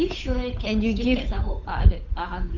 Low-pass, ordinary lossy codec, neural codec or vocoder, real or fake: 7.2 kHz; none; codec, 16 kHz, 8 kbps, FunCodec, trained on Chinese and English, 25 frames a second; fake